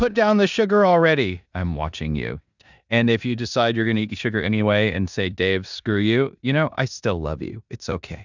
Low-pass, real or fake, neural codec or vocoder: 7.2 kHz; fake; codec, 16 kHz in and 24 kHz out, 0.9 kbps, LongCat-Audio-Codec, four codebook decoder